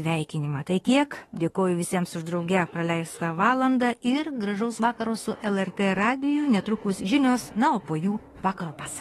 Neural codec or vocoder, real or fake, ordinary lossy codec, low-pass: autoencoder, 48 kHz, 32 numbers a frame, DAC-VAE, trained on Japanese speech; fake; AAC, 32 kbps; 19.8 kHz